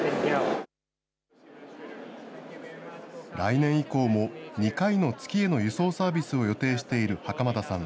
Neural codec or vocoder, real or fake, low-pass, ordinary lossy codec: none; real; none; none